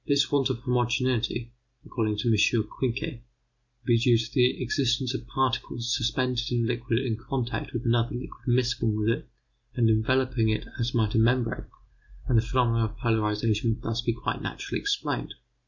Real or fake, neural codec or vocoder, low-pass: real; none; 7.2 kHz